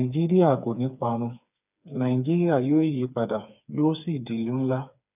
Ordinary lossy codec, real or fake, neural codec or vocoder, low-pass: none; fake; codec, 16 kHz, 4 kbps, FreqCodec, smaller model; 3.6 kHz